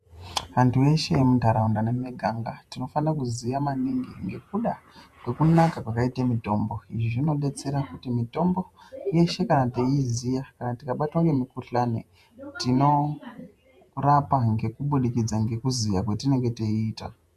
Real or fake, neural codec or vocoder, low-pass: fake; vocoder, 48 kHz, 128 mel bands, Vocos; 14.4 kHz